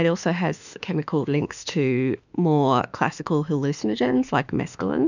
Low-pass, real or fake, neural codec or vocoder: 7.2 kHz; fake; autoencoder, 48 kHz, 32 numbers a frame, DAC-VAE, trained on Japanese speech